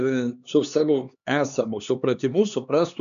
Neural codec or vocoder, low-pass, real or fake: codec, 16 kHz, 2 kbps, X-Codec, HuBERT features, trained on LibriSpeech; 7.2 kHz; fake